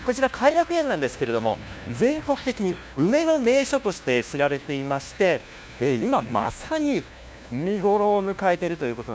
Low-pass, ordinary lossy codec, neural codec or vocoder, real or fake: none; none; codec, 16 kHz, 1 kbps, FunCodec, trained on LibriTTS, 50 frames a second; fake